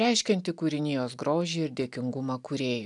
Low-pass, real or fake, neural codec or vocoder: 10.8 kHz; real; none